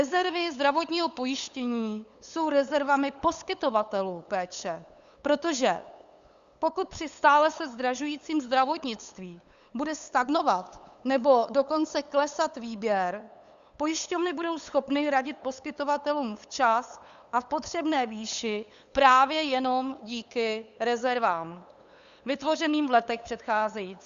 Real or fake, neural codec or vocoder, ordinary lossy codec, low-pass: fake; codec, 16 kHz, 8 kbps, FunCodec, trained on LibriTTS, 25 frames a second; Opus, 64 kbps; 7.2 kHz